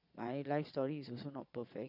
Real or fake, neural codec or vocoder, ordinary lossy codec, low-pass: fake; vocoder, 22.05 kHz, 80 mel bands, Vocos; none; 5.4 kHz